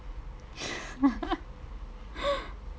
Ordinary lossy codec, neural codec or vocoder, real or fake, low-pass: none; none; real; none